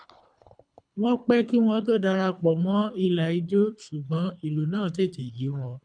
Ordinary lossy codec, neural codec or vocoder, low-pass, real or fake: none; codec, 24 kHz, 3 kbps, HILCodec; 9.9 kHz; fake